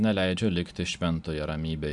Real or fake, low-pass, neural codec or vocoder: real; 10.8 kHz; none